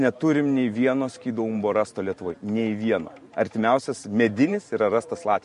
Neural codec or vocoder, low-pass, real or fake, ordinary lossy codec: vocoder, 44.1 kHz, 128 mel bands every 512 samples, BigVGAN v2; 14.4 kHz; fake; MP3, 48 kbps